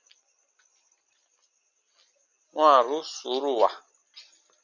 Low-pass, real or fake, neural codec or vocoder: 7.2 kHz; real; none